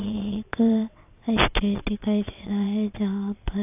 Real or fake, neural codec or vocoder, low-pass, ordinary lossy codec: fake; vocoder, 22.05 kHz, 80 mel bands, WaveNeXt; 3.6 kHz; AAC, 32 kbps